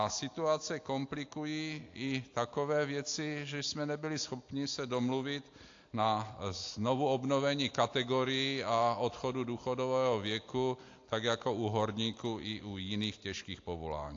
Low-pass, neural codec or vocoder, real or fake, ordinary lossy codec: 7.2 kHz; none; real; AAC, 48 kbps